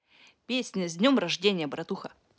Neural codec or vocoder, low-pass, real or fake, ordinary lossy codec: none; none; real; none